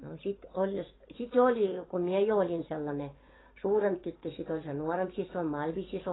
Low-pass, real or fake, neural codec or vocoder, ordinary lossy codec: 7.2 kHz; fake; codec, 44.1 kHz, 7.8 kbps, DAC; AAC, 16 kbps